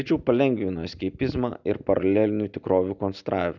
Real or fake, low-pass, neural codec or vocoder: real; 7.2 kHz; none